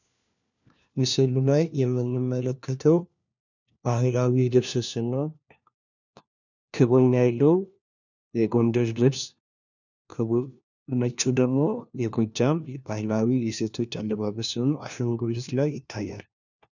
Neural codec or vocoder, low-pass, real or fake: codec, 16 kHz, 1 kbps, FunCodec, trained on LibriTTS, 50 frames a second; 7.2 kHz; fake